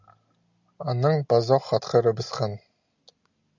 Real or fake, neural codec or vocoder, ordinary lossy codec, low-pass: real; none; Opus, 64 kbps; 7.2 kHz